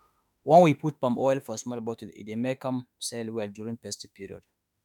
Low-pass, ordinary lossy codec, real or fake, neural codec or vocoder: 19.8 kHz; none; fake; autoencoder, 48 kHz, 32 numbers a frame, DAC-VAE, trained on Japanese speech